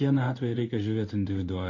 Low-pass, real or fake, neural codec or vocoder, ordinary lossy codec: 7.2 kHz; fake; codec, 16 kHz in and 24 kHz out, 1 kbps, XY-Tokenizer; MP3, 32 kbps